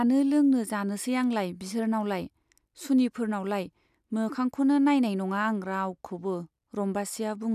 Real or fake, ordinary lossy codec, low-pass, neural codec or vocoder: real; none; 14.4 kHz; none